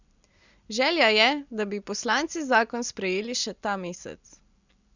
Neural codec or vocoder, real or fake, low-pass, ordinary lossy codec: none; real; 7.2 kHz; Opus, 64 kbps